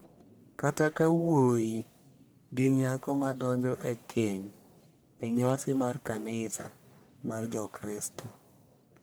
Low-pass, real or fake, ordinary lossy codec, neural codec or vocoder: none; fake; none; codec, 44.1 kHz, 1.7 kbps, Pupu-Codec